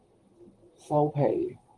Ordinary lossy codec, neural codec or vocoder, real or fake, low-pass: Opus, 24 kbps; vocoder, 44.1 kHz, 128 mel bands, Pupu-Vocoder; fake; 10.8 kHz